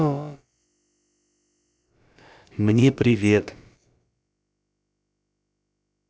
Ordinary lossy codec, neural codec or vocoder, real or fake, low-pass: none; codec, 16 kHz, about 1 kbps, DyCAST, with the encoder's durations; fake; none